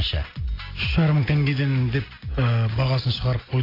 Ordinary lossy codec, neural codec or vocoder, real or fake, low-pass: AAC, 24 kbps; none; real; 5.4 kHz